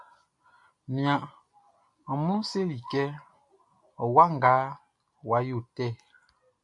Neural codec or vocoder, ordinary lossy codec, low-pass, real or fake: none; AAC, 64 kbps; 10.8 kHz; real